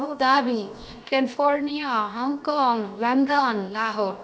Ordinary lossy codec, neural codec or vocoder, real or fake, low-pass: none; codec, 16 kHz, 0.7 kbps, FocalCodec; fake; none